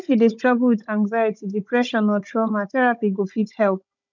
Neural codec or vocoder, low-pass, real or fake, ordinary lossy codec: codec, 16 kHz, 16 kbps, FunCodec, trained on Chinese and English, 50 frames a second; 7.2 kHz; fake; none